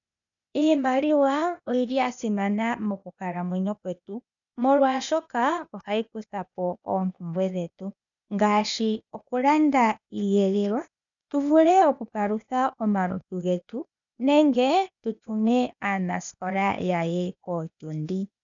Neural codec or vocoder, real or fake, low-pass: codec, 16 kHz, 0.8 kbps, ZipCodec; fake; 7.2 kHz